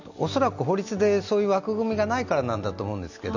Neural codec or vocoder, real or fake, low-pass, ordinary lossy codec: none; real; 7.2 kHz; none